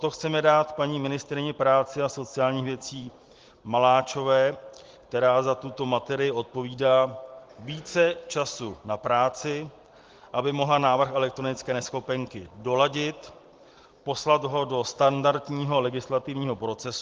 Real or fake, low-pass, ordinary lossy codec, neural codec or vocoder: real; 7.2 kHz; Opus, 16 kbps; none